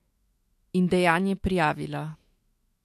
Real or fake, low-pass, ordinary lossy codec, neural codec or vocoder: fake; 14.4 kHz; MP3, 64 kbps; autoencoder, 48 kHz, 128 numbers a frame, DAC-VAE, trained on Japanese speech